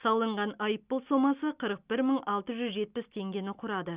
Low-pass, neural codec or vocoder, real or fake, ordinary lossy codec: 3.6 kHz; none; real; Opus, 64 kbps